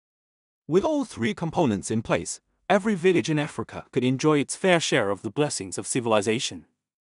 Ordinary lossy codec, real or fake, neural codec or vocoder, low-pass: none; fake; codec, 16 kHz in and 24 kHz out, 0.4 kbps, LongCat-Audio-Codec, two codebook decoder; 10.8 kHz